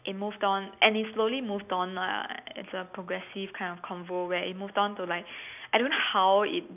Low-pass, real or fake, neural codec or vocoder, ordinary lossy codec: 3.6 kHz; real; none; none